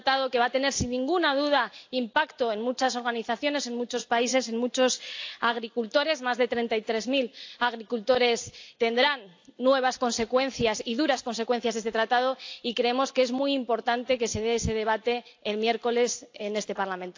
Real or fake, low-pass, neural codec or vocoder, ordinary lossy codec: real; 7.2 kHz; none; AAC, 48 kbps